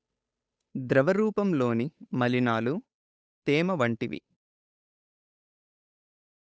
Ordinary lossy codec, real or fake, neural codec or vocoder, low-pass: none; fake; codec, 16 kHz, 8 kbps, FunCodec, trained on Chinese and English, 25 frames a second; none